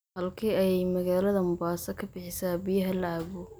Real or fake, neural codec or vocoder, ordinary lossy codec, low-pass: real; none; none; none